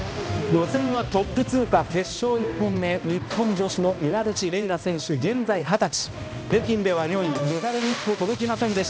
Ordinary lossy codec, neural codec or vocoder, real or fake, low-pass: none; codec, 16 kHz, 1 kbps, X-Codec, HuBERT features, trained on balanced general audio; fake; none